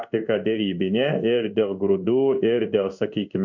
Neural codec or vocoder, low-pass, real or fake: codec, 16 kHz in and 24 kHz out, 1 kbps, XY-Tokenizer; 7.2 kHz; fake